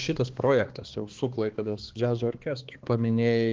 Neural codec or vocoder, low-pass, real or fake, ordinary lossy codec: codec, 16 kHz, 2 kbps, X-Codec, HuBERT features, trained on balanced general audio; 7.2 kHz; fake; Opus, 16 kbps